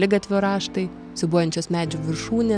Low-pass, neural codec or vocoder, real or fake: 9.9 kHz; none; real